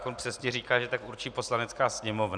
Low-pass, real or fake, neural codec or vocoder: 9.9 kHz; real; none